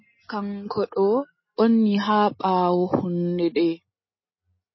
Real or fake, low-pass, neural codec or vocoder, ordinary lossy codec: real; 7.2 kHz; none; MP3, 24 kbps